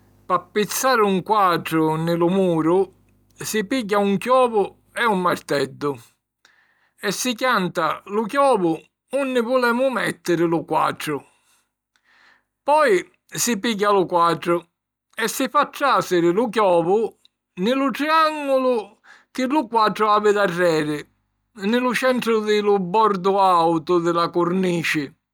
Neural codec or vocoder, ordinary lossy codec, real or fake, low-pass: none; none; real; none